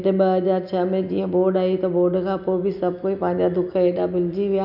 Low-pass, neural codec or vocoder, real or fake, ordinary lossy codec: 5.4 kHz; none; real; none